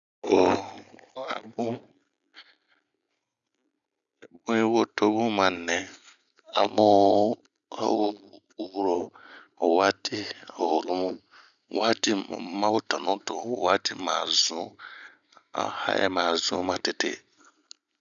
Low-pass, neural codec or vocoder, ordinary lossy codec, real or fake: 7.2 kHz; none; none; real